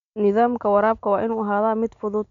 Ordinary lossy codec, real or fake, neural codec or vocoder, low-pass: none; real; none; 7.2 kHz